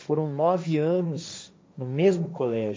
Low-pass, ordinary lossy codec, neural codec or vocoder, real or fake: none; none; codec, 16 kHz, 1.1 kbps, Voila-Tokenizer; fake